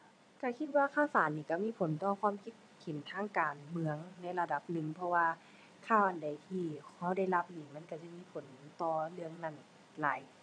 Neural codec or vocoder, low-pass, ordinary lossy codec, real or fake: vocoder, 22.05 kHz, 80 mel bands, Vocos; 9.9 kHz; MP3, 96 kbps; fake